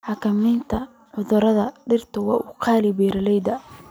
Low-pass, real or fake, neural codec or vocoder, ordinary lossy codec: none; real; none; none